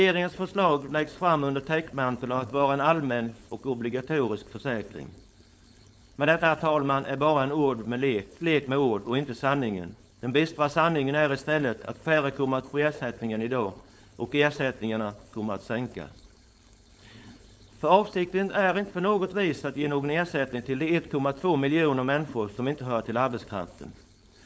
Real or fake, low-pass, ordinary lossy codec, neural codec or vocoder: fake; none; none; codec, 16 kHz, 4.8 kbps, FACodec